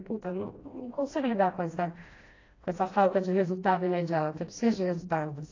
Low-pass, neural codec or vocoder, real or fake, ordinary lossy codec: 7.2 kHz; codec, 16 kHz, 1 kbps, FreqCodec, smaller model; fake; AAC, 32 kbps